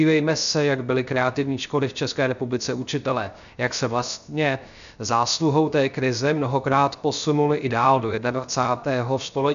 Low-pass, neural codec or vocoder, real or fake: 7.2 kHz; codec, 16 kHz, 0.3 kbps, FocalCodec; fake